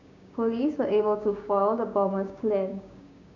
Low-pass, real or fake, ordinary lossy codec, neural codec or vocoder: 7.2 kHz; fake; none; codec, 16 kHz, 6 kbps, DAC